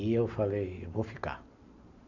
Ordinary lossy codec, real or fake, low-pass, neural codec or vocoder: none; real; 7.2 kHz; none